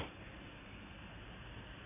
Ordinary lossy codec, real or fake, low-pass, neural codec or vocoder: MP3, 24 kbps; fake; 3.6 kHz; vocoder, 44.1 kHz, 128 mel bands every 256 samples, BigVGAN v2